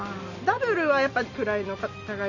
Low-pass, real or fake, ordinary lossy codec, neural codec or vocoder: 7.2 kHz; real; none; none